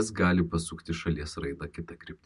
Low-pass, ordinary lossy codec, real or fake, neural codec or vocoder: 10.8 kHz; MP3, 64 kbps; real; none